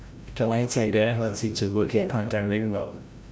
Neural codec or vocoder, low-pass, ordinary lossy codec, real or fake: codec, 16 kHz, 0.5 kbps, FreqCodec, larger model; none; none; fake